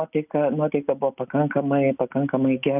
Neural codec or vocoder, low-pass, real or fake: none; 3.6 kHz; real